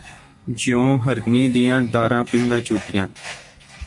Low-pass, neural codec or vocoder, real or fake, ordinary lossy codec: 10.8 kHz; codec, 32 kHz, 1.9 kbps, SNAC; fake; MP3, 48 kbps